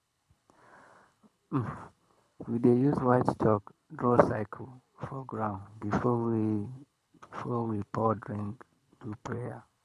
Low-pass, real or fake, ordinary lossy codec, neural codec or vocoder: none; fake; none; codec, 24 kHz, 6 kbps, HILCodec